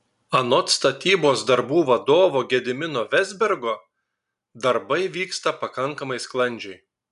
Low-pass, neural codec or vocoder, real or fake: 10.8 kHz; none; real